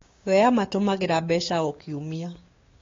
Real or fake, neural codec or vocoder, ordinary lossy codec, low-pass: fake; codec, 16 kHz, 6 kbps, DAC; AAC, 32 kbps; 7.2 kHz